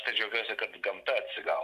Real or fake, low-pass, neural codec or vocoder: fake; 14.4 kHz; vocoder, 44.1 kHz, 128 mel bands every 512 samples, BigVGAN v2